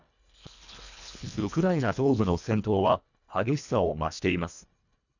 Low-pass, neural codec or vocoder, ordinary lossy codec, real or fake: 7.2 kHz; codec, 24 kHz, 1.5 kbps, HILCodec; none; fake